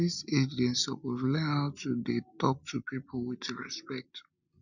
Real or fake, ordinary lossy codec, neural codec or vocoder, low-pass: real; none; none; 7.2 kHz